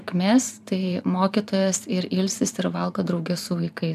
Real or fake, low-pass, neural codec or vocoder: fake; 14.4 kHz; vocoder, 44.1 kHz, 128 mel bands every 512 samples, BigVGAN v2